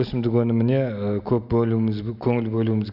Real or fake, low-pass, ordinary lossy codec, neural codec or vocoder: real; 5.4 kHz; none; none